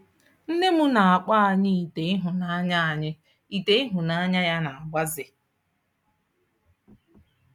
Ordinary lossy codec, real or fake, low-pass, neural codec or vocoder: none; real; 19.8 kHz; none